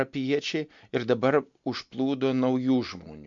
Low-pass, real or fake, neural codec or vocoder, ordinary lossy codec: 7.2 kHz; real; none; MP3, 64 kbps